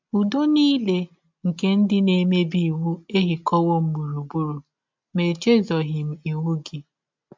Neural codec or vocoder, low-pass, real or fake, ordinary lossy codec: none; 7.2 kHz; real; MP3, 64 kbps